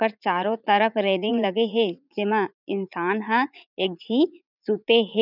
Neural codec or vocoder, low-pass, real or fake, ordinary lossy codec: vocoder, 44.1 kHz, 80 mel bands, Vocos; 5.4 kHz; fake; none